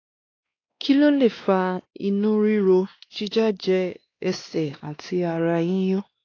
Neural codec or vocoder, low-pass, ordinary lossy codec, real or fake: codec, 16 kHz, 2 kbps, X-Codec, WavLM features, trained on Multilingual LibriSpeech; 7.2 kHz; AAC, 32 kbps; fake